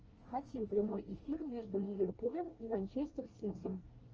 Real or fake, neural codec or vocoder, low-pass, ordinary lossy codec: fake; codec, 24 kHz, 1 kbps, SNAC; 7.2 kHz; Opus, 24 kbps